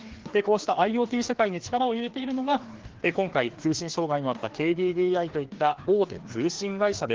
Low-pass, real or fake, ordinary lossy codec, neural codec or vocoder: 7.2 kHz; fake; Opus, 16 kbps; codec, 16 kHz, 2 kbps, FreqCodec, larger model